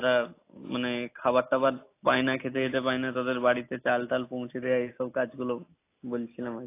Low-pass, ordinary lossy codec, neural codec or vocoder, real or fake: 3.6 kHz; AAC, 24 kbps; none; real